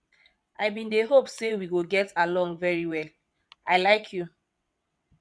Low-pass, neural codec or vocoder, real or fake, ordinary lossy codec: none; vocoder, 22.05 kHz, 80 mel bands, WaveNeXt; fake; none